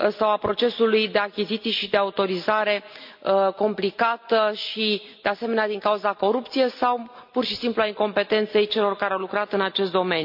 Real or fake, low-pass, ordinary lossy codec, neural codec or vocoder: real; 5.4 kHz; MP3, 48 kbps; none